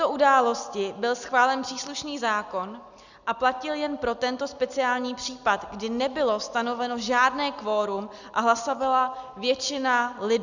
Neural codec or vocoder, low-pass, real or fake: none; 7.2 kHz; real